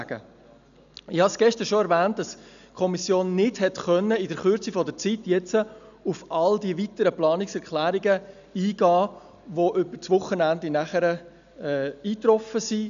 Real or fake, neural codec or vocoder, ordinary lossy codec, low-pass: real; none; none; 7.2 kHz